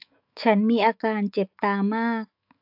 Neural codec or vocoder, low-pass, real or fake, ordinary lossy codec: autoencoder, 48 kHz, 128 numbers a frame, DAC-VAE, trained on Japanese speech; 5.4 kHz; fake; AAC, 48 kbps